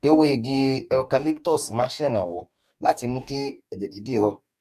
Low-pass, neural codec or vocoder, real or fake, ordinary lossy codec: 14.4 kHz; codec, 44.1 kHz, 2.6 kbps, DAC; fake; none